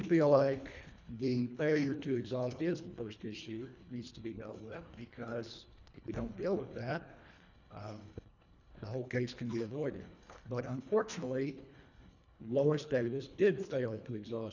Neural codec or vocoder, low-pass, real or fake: codec, 24 kHz, 1.5 kbps, HILCodec; 7.2 kHz; fake